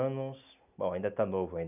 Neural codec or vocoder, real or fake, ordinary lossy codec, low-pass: none; real; none; 3.6 kHz